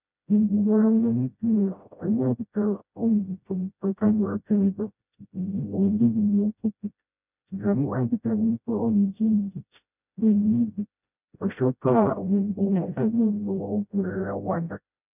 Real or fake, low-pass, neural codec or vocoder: fake; 3.6 kHz; codec, 16 kHz, 0.5 kbps, FreqCodec, smaller model